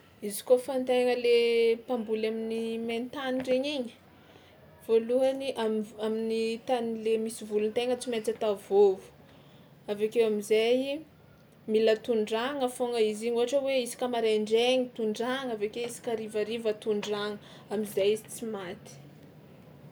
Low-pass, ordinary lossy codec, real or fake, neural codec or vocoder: none; none; real; none